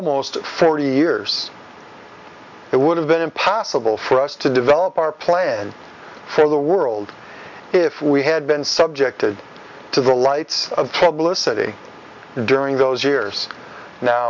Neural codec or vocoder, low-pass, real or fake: none; 7.2 kHz; real